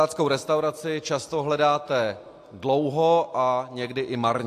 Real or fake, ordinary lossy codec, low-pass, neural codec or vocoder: real; AAC, 64 kbps; 14.4 kHz; none